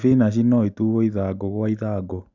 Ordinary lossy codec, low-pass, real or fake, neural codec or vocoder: none; 7.2 kHz; real; none